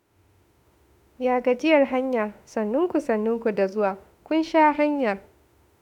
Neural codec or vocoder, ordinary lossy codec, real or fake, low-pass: autoencoder, 48 kHz, 32 numbers a frame, DAC-VAE, trained on Japanese speech; none; fake; 19.8 kHz